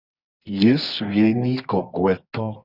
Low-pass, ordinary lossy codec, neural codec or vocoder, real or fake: 5.4 kHz; Opus, 64 kbps; codec, 24 kHz, 0.9 kbps, WavTokenizer, medium music audio release; fake